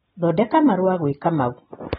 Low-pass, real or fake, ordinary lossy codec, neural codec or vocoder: 19.8 kHz; real; AAC, 16 kbps; none